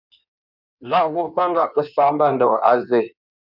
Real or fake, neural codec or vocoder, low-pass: fake; codec, 16 kHz in and 24 kHz out, 1.1 kbps, FireRedTTS-2 codec; 5.4 kHz